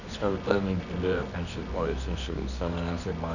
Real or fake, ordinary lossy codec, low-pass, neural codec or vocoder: fake; none; 7.2 kHz; codec, 24 kHz, 0.9 kbps, WavTokenizer, medium music audio release